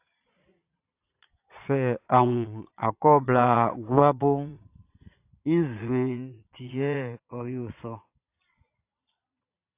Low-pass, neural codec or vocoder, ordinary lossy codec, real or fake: 3.6 kHz; vocoder, 22.05 kHz, 80 mel bands, Vocos; AAC, 24 kbps; fake